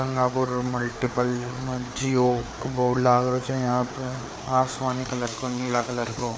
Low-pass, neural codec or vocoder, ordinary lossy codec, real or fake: none; codec, 16 kHz, 4 kbps, FreqCodec, larger model; none; fake